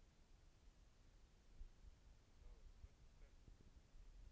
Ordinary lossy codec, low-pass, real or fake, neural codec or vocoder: none; none; real; none